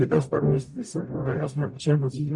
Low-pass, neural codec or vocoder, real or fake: 10.8 kHz; codec, 44.1 kHz, 0.9 kbps, DAC; fake